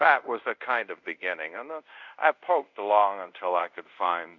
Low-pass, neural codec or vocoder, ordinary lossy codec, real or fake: 7.2 kHz; codec, 24 kHz, 0.5 kbps, DualCodec; MP3, 64 kbps; fake